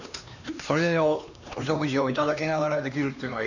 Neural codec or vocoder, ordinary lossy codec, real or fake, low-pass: codec, 16 kHz, 2 kbps, X-Codec, HuBERT features, trained on LibriSpeech; none; fake; 7.2 kHz